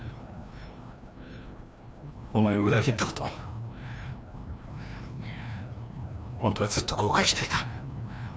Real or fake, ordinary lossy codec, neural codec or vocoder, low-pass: fake; none; codec, 16 kHz, 1 kbps, FreqCodec, larger model; none